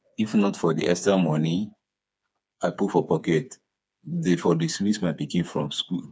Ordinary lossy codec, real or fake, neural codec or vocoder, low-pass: none; fake; codec, 16 kHz, 4 kbps, FreqCodec, smaller model; none